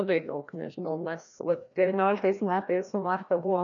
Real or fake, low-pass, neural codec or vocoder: fake; 7.2 kHz; codec, 16 kHz, 1 kbps, FreqCodec, larger model